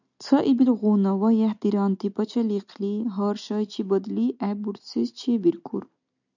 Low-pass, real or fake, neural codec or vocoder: 7.2 kHz; real; none